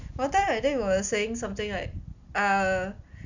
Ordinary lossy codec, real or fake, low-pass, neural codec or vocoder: none; real; 7.2 kHz; none